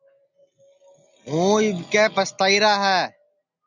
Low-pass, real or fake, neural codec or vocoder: 7.2 kHz; real; none